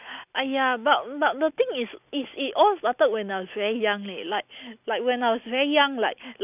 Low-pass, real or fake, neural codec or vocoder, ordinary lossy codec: 3.6 kHz; real; none; none